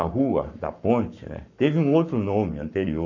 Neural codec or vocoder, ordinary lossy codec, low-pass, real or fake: codec, 44.1 kHz, 7.8 kbps, Pupu-Codec; none; 7.2 kHz; fake